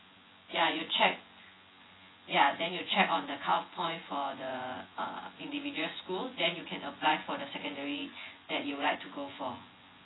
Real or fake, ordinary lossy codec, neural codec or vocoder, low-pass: fake; AAC, 16 kbps; vocoder, 24 kHz, 100 mel bands, Vocos; 7.2 kHz